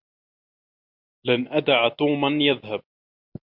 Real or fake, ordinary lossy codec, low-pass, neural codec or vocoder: real; MP3, 48 kbps; 5.4 kHz; none